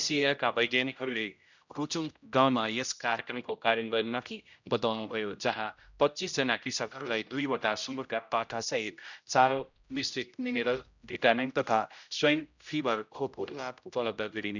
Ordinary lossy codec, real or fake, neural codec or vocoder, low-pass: none; fake; codec, 16 kHz, 0.5 kbps, X-Codec, HuBERT features, trained on general audio; 7.2 kHz